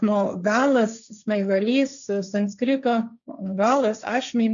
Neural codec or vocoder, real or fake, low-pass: codec, 16 kHz, 1.1 kbps, Voila-Tokenizer; fake; 7.2 kHz